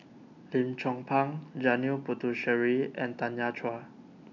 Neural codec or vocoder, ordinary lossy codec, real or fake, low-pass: none; none; real; 7.2 kHz